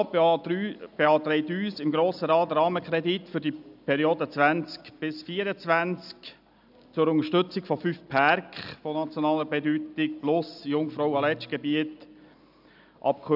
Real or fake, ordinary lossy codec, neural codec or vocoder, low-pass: real; none; none; 5.4 kHz